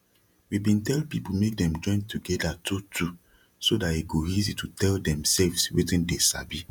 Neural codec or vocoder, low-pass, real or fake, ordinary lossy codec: vocoder, 48 kHz, 128 mel bands, Vocos; 19.8 kHz; fake; none